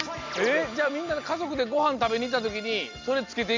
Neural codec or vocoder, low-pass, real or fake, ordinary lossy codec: none; 7.2 kHz; real; MP3, 48 kbps